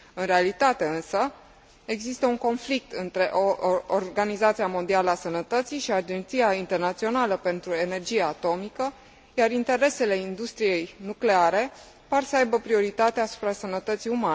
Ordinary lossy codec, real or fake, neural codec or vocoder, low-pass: none; real; none; none